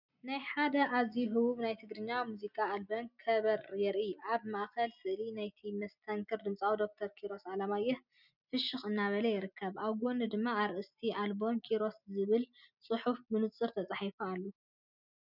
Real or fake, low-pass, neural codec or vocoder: real; 5.4 kHz; none